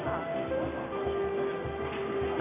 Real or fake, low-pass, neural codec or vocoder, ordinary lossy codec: real; 3.6 kHz; none; none